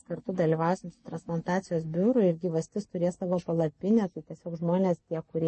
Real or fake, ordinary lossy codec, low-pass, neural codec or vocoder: real; MP3, 32 kbps; 10.8 kHz; none